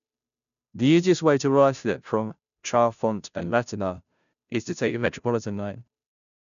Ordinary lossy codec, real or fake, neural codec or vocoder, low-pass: none; fake; codec, 16 kHz, 0.5 kbps, FunCodec, trained on Chinese and English, 25 frames a second; 7.2 kHz